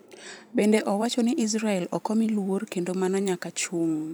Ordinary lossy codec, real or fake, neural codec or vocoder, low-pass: none; fake; vocoder, 44.1 kHz, 128 mel bands every 512 samples, BigVGAN v2; none